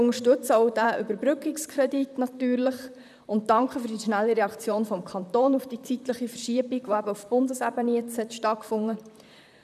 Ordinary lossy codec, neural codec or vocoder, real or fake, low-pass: none; vocoder, 44.1 kHz, 128 mel bands, Pupu-Vocoder; fake; 14.4 kHz